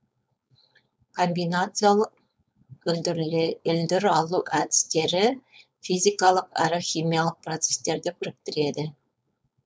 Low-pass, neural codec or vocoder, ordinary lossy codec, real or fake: none; codec, 16 kHz, 4.8 kbps, FACodec; none; fake